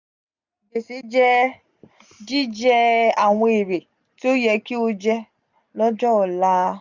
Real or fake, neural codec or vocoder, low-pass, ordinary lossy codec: real; none; 7.2 kHz; none